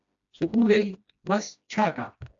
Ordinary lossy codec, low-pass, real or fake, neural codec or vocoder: AAC, 64 kbps; 7.2 kHz; fake; codec, 16 kHz, 1 kbps, FreqCodec, smaller model